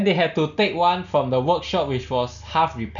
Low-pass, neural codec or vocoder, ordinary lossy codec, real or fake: 7.2 kHz; none; none; real